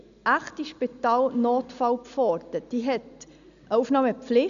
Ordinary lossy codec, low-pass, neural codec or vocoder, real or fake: none; 7.2 kHz; none; real